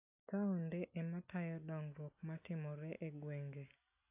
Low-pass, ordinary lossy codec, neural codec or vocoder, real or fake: 3.6 kHz; AAC, 32 kbps; none; real